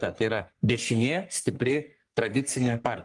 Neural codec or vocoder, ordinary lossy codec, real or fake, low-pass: codec, 44.1 kHz, 3.4 kbps, Pupu-Codec; Opus, 32 kbps; fake; 10.8 kHz